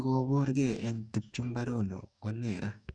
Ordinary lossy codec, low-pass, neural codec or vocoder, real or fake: none; 9.9 kHz; codec, 44.1 kHz, 2.6 kbps, DAC; fake